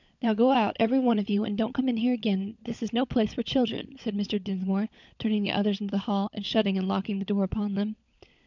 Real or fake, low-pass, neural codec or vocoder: fake; 7.2 kHz; codec, 16 kHz, 16 kbps, FunCodec, trained on LibriTTS, 50 frames a second